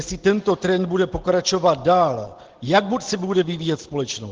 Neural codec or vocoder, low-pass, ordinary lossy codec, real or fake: none; 7.2 kHz; Opus, 16 kbps; real